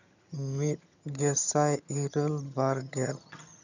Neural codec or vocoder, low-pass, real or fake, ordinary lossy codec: vocoder, 22.05 kHz, 80 mel bands, HiFi-GAN; 7.2 kHz; fake; none